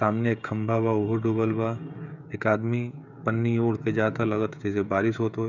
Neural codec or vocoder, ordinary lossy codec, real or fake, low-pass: codec, 16 kHz, 16 kbps, FreqCodec, smaller model; none; fake; 7.2 kHz